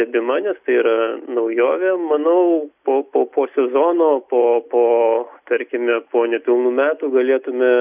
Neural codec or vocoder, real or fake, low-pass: none; real; 3.6 kHz